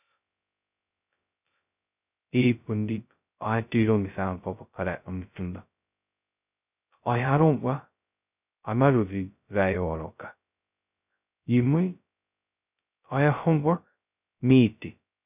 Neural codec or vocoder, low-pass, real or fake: codec, 16 kHz, 0.2 kbps, FocalCodec; 3.6 kHz; fake